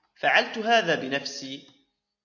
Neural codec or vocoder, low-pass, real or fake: none; 7.2 kHz; real